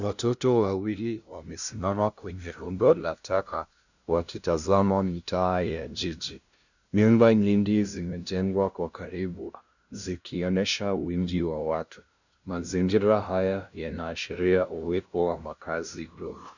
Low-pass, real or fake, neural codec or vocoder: 7.2 kHz; fake; codec, 16 kHz, 0.5 kbps, FunCodec, trained on LibriTTS, 25 frames a second